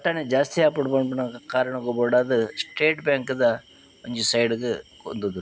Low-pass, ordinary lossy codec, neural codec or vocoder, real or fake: none; none; none; real